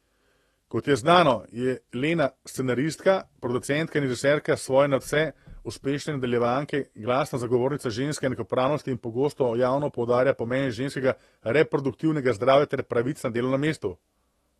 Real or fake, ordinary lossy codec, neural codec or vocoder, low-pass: fake; AAC, 32 kbps; autoencoder, 48 kHz, 128 numbers a frame, DAC-VAE, trained on Japanese speech; 19.8 kHz